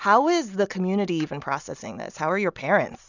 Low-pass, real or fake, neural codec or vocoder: 7.2 kHz; real; none